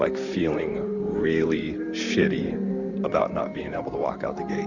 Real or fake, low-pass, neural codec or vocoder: fake; 7.2 kHz; vocoder, 44.1 kHz, 128 mel bands, Pupu-Vocoder